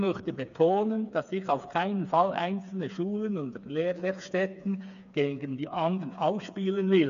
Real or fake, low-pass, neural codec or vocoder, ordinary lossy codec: fake; 7.2 kHz; codec, 16 kHz, 4 kbps, FreqCodec, smaller model; none